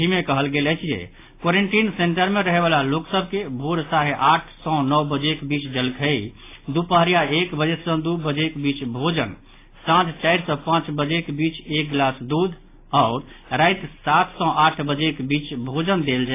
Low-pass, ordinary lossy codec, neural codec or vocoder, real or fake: 3.6 kHz; AAC, 24 kbps; none; real